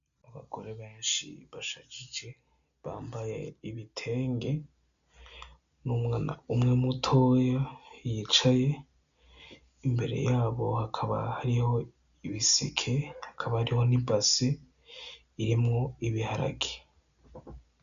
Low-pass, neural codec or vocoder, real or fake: 7.2 kHz; none; real